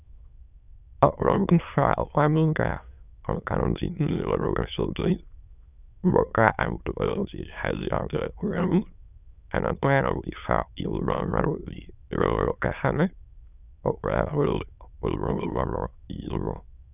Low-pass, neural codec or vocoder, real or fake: 3.6 kHz; autoencoder, 22.05 kHz, a latent of 192 numbers a frame, VITS, trained on many speakers; fake